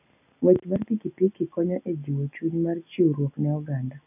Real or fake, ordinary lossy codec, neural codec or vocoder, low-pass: real; none; none; 3.6 kHz